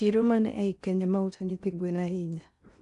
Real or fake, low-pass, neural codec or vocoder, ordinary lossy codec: fake; 10.8 kHz; codec, 16 kHz in and 24 kHz out, 0.6 kbps, FocalCodec, streaming, 4096 codes; none